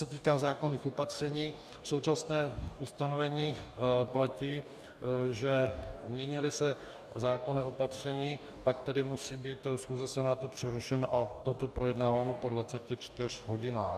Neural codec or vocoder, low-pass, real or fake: codec, 44.1 kHz, 2.6 kbps, DAC; 14.4 kHz; fake